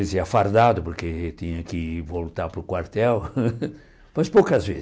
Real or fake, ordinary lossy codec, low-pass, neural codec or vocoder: real; none; none; none